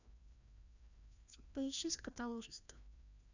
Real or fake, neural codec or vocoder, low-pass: fake; codec, 16 kHz, 1 kbps, FreqCodec, larger model; 7.2 kHz